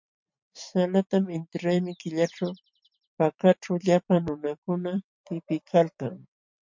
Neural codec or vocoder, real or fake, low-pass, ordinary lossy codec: vocoder, 44.1 kHz, 128 mel bands every 512 samples, BigVGAN v2; fake; 7.2 kHz; MP3, 48 kbps